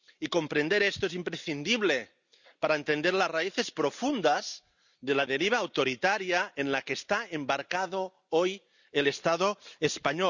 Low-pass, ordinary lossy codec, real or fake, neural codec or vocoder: 7.2 kHz; none; real; none